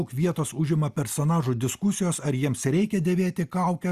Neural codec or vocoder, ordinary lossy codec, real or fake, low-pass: none; Opus, 64 kbps; real; 14.4 kHz